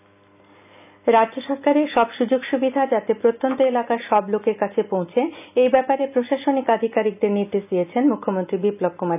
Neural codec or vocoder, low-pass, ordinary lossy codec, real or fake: none; 3.6 kHz; none; real